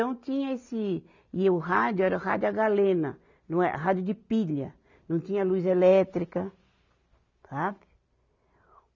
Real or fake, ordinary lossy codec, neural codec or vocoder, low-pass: real; none; none; 7.2 kHz